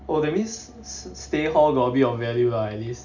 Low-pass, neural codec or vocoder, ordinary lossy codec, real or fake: 7.2 kHz; none; none; real